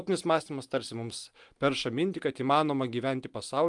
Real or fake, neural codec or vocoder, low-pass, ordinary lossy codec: real; none; 10.8 kHz; Opus, 32 kbps